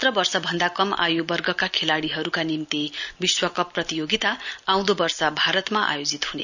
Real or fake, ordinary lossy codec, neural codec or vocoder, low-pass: real; none; none; 7.2 kHz